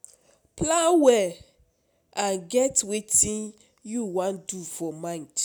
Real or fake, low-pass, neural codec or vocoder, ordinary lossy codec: real; none; none; none